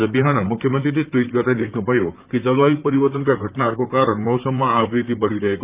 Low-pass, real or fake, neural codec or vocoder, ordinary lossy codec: 3.6 kHz; fake; vocoder, 44.1 kHz, 128 mel bands, Pupu-Vocoder; Opus, 24 kbps